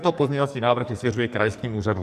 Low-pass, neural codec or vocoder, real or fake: 14.4 kHz; codec, 44.1 kHz, 2.6 kbps, SNAC; fake